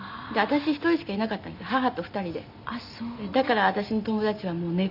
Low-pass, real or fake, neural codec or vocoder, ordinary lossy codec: 5.4 kHz; real; none; none